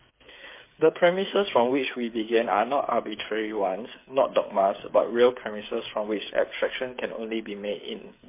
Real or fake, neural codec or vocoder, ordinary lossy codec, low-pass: fake; codec, 16 kHz, 8 kbps, FreqCodec, smaller model; MP3, 24 kbps; 3.6 kHz